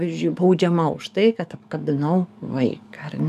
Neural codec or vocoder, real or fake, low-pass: codec, 44.1 kHz, 7.8 kbps, DAC; fake; 14.4 kHz